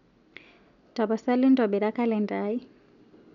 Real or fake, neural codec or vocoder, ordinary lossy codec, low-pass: real; none; none; 7.2 kHz